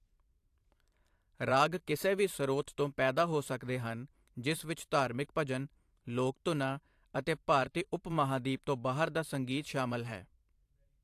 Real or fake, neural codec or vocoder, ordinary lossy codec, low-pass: fake; vocoder, 44.1 kHz, 128 mel bands every 512 samples, BigVGAN v2; AAC, 64 kbps; 14.4 kHz